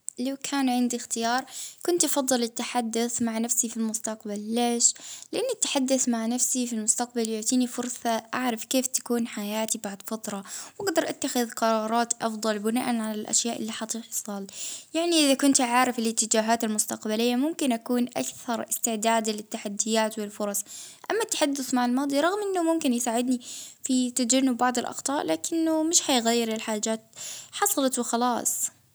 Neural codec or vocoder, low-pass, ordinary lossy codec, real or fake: none; none; none; real